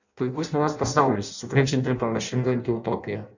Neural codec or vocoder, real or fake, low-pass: codec, 16 kHz in and 24 kHz out, 0.6 kbps, FireRedTTS-2 codec; fake; 7.2 kHz